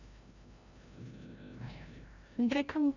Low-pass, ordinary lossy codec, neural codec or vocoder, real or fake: 7.2 kHz; none; codec, 16 kHz, 0.5 kbps, FreqCodec, larger model; fake